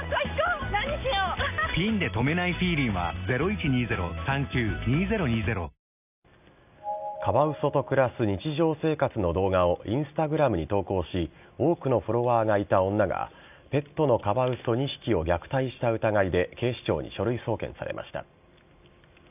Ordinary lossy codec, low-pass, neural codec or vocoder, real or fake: none; 3.6 kHz; none; real